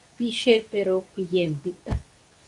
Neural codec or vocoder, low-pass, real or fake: codec, 24 kHz, 0.9 kbps, WavTokenizer, medium speech release version 2; 10.8 kHz; fake